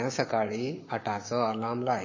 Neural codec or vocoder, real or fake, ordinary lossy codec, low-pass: codec, 16 kHz, 6 kbps, DAC; fake; MP3, 32 kbps; 7.2 kHz